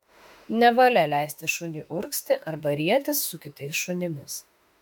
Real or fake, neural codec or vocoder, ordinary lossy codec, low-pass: fake; autoencoder, 48 kHz, 32 numbers a frame, DAC-VAE, trained on Japanese speech; MP3, 96 kbps; 19.8 kHz